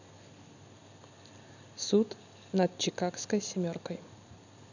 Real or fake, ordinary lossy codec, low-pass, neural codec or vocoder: real; none; 7.2 kHz; none